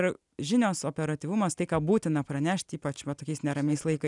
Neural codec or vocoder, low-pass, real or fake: none; 10.8 kHz; real